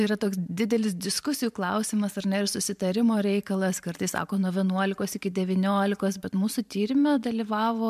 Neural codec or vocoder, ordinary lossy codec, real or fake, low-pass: vocoder, 44.1 kHz, 128 mel bands every 512 samples, BigVGAN v2; MP3, 96 kbps; fake; 14.4 kHz